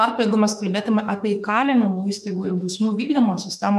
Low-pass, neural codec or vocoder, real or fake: 14.4 kHz; autoencoder, 48 kHz, 32 numbers a frame, DAC-VAE, trained on Japanese speech; fake